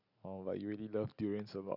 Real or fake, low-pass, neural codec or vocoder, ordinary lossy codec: real; 5.4 kHz; none; none